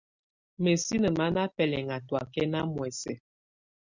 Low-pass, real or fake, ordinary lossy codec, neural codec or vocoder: 7.2 kHz; real; Opus, 64 kbps; none